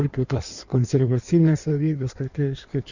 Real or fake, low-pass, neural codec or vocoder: fake; 7.2 kHz; codec, 16 kHz in and 24 kHz out, 1.1 kbps, FireRedTTS-2 codec